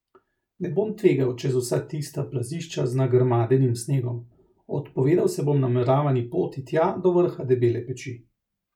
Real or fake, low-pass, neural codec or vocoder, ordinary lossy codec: real; 19.8 kHz; none; none